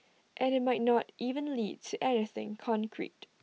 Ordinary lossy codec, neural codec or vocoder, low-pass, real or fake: none; none; none; real